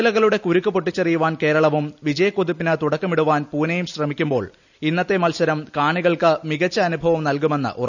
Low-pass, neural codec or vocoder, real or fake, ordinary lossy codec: 7.2 kHz; none; real; none